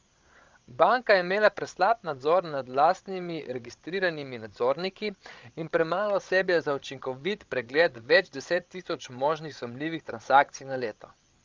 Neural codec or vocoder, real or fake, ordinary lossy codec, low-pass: codec, 16 kHz, 16 kbps, FreqCodec, larger model; fake; Opus, 32 kbps; 7.2 kHz